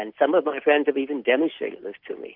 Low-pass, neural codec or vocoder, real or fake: 5.4 kHz; none; real